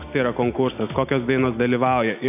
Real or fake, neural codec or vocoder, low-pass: real; none; 3.6 kHz